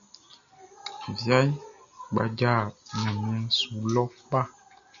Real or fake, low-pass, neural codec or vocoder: real; 7.2 kHz; none